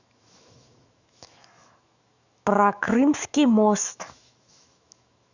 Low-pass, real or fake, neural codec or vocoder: 7.2 kHz; fake; autoencoder, 48 kHz, 128 numbers a frame, DAC-VAE, trained on Japanese speech